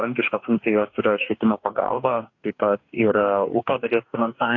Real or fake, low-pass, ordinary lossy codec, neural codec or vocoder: fake; 7.2 kHz; AAC, 48 kbps; codec, 44.1 kHz, 2.6 kbps, DAC